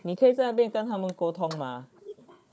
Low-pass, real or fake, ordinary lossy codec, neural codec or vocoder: none; fake; none; codec, 16 kHz, 4 kbps, FunCodec, trained on Chinese and English, 50 frames a second